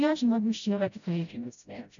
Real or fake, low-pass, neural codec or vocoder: fake; 7.2 kHz; codec, 16 kHz, 0.5 kbps, FreqCodec, smaller model